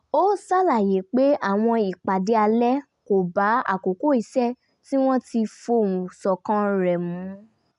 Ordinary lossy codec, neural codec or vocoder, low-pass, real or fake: none; none; 10.8 kHz; real